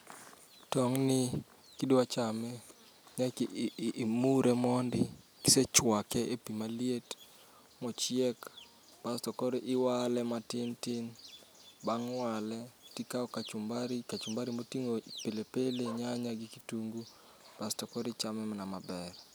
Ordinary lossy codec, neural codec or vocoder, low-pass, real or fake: none; none; none; real